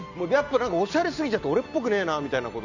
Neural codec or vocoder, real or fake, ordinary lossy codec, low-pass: none; real; none; 7.2 kHz